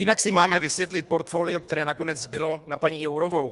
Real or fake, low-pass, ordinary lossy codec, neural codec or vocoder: fake; 10.8 kHz; Opus, 64 kbps; codec, 24 kHz, 1.5 kbps, HILCodec